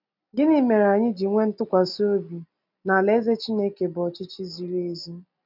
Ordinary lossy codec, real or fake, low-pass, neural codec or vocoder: none; real; 5.4 kHz; none